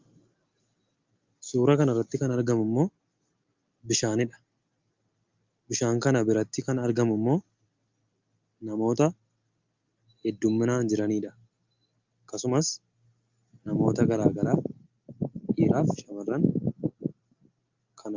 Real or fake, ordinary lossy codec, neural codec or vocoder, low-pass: real; Opus, 24 kbps; none; 7.2 kHz